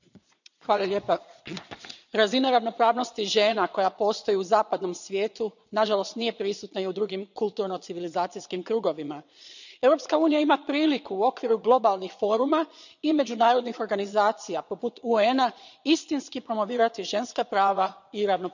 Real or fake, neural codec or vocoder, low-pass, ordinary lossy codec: fake; vocoder, 22.05 kHz, 80 mel bands, Vocos; 7.2 kHz; none